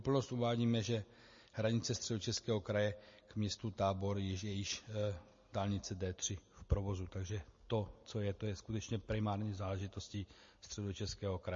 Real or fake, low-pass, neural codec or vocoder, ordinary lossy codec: real; 7.2 kHz; none; MP3, 32 kbps